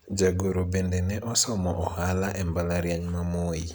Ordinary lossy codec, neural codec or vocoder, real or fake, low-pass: none; none; real; none